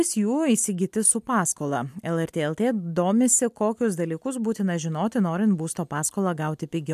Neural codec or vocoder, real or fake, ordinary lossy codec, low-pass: none; real; MP3, 96 kbps; 14.4 kHz